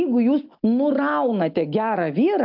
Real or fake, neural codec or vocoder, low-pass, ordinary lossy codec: real; none; 5.4 kHz; AAC, 48 kbps